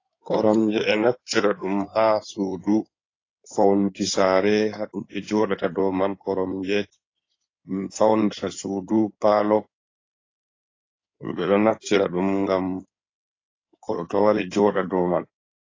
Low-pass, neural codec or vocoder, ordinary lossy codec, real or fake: 7.2 kHz; codec, 16 kHz in and 24 kHz out, 2.2 kbps, FireRedTTS-2 codec; AAC, 32 kbps; fake